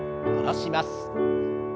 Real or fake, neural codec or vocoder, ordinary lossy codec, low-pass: real; none; none; none